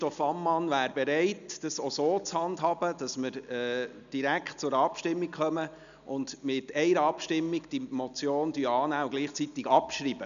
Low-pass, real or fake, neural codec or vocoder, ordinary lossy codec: 7.2 kHz; real; none; none